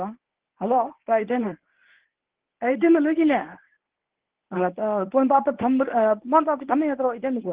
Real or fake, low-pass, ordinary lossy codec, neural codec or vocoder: fake; 3.6 kHz; Opus, 16 kbps; codec, 24 kHz, 0.9 kbps, WavTokenizer, medium speech release version 1